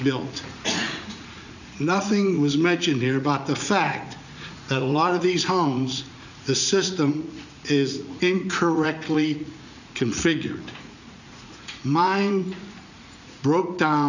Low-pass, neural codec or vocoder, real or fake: 7.2 kHz; vocoder, 44.1 kHz, 80 mel bands, Vocos; fake